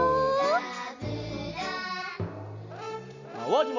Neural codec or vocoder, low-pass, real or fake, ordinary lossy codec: none; 7.2 kHz; real; Opus, 64 kbps